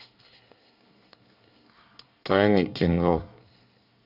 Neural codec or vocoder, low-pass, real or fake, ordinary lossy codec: codec, 24 kHz, 1 kbps, SNAC; 5.4 kHz; fake; none